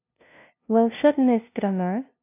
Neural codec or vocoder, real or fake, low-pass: codec, 16 kHz, 0.5 kbps, FunCodec, trained on LibriTTS, 25 frames a second; fake; 3.6 kHz